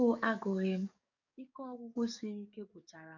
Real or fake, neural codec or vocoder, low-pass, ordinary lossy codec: fake; codec, 44.1 kHz, 7.8 kbps, DAC; 7.2 kHz; none